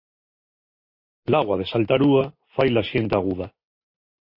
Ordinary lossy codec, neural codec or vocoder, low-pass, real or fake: MP3, 32 kbps; none; 5.4 kHz; real